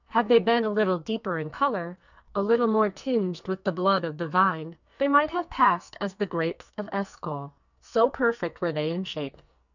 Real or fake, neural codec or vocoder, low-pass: fake; codec, 44.1 kHz, 2.6 kbps, SNAC; 7.2 kHz